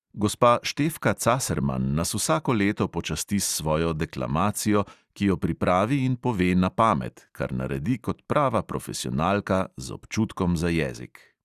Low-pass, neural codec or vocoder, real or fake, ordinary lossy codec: 14.4 kHz; none; real; Opus, 64 kbps